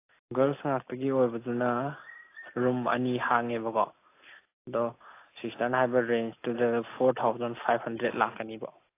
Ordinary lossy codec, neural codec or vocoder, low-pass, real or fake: AAC, 24 kbps; none; 3.6 kHz; real